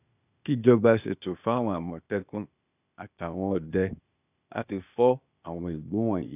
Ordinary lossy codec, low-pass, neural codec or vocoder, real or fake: none; 3.6 kHz; codec, 16 kHz, 0.8 kbps, ZipCodec; fake